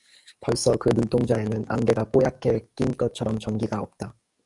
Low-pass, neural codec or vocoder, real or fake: 10.8 kHz; codec, 44.1 kHz, 7.8 kbps, DAC; fake